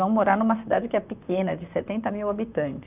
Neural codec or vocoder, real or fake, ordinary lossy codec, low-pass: vocoder, 44.1 kHz, 128 mel bands, Pupu-Vocoder; fake; none; 3.6 kHz